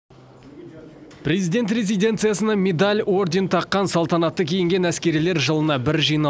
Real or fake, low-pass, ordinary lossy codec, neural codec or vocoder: real; none; none; none